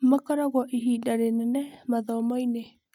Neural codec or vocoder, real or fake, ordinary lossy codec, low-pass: none; real; none; 19.8 kHz